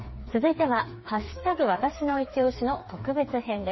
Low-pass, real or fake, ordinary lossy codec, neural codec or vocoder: 7.2 kHz; fake; MP3, 24 kbps; codec, 16 kHz, 4 kbps, FreqCodec, smaller model